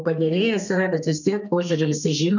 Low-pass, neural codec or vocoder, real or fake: 7.2 kHz; codec, 32 kHz, 1.9 kbps, SNAC; fake